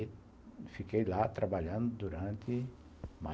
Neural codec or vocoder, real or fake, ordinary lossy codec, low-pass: none; real; none; none